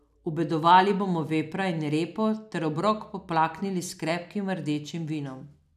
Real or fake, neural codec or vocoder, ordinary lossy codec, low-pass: real; none; none; 14.4 kHz